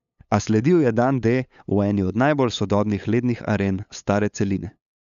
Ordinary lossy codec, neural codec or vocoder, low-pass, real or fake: MP3, 96 kbps; codec, 16 kHz, 8 kbps, FunCodec, trained on LibriTTS, 25 frames a second; 7.2 kHz; fake